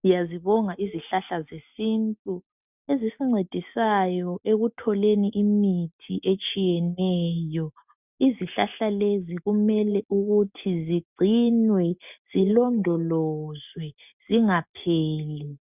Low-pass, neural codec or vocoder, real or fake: 3.6 kHz; none; real